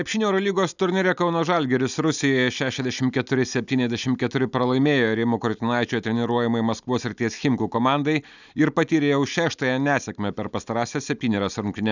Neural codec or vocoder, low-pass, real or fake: none; 7.2 kHz; real